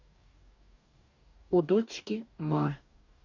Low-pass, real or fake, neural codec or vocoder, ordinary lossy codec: 7.2 kHz; fake; codec, 44.1 kHz, 2.6 kbps, DAC; none